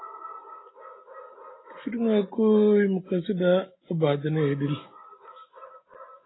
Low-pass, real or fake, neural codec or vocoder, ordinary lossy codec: 7.2 kHz; real; none; AAC, 16 kbps